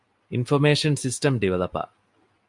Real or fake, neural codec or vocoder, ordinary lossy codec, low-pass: real; none; MP3, 64 kbps; 10.8 kHz